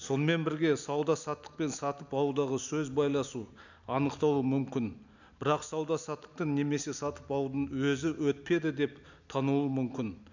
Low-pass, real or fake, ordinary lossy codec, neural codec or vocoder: 7.2 kHz; real; none; none